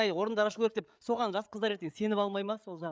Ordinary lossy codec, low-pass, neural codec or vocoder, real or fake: none; none; codec, 16 kHz, 8 kbps, FreqCodec, larger model; fake